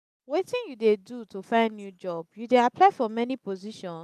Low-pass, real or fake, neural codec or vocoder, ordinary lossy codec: 14.4 kHz; real; none; none